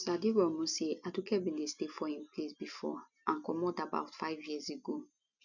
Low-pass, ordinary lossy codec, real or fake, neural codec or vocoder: 7.2 kHz; none; real; none